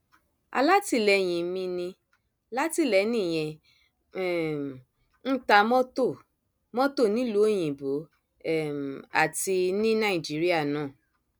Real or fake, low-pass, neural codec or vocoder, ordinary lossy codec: real; none; none; none